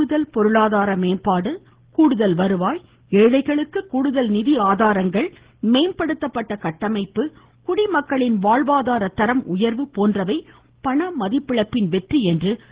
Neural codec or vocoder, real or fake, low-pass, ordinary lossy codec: none; real; 3.6 kHz; Opus, 16 kbps